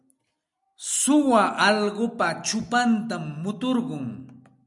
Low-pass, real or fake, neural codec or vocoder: 10.8 kHz; real; none